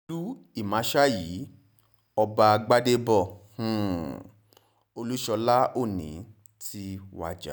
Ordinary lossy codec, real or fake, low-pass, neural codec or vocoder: none; real; none; none